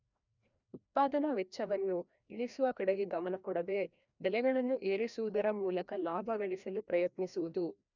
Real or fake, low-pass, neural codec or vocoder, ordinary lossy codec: fake; 7.2 kHz; codec, 16 kHz, 1 kbps, FreqCodec, larger model; none